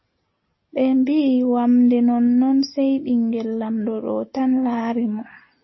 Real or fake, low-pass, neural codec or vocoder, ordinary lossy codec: real; 7.2 kHz; none; MP3, 24 kbps